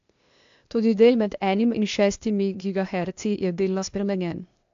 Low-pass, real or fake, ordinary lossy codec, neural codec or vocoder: 7.2 kHz; fake; AAC, 64 kbps; codec, 16 kHz, 0.8 kbps, ZipCodec